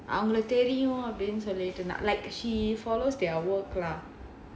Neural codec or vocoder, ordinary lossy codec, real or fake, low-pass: none; none; real; none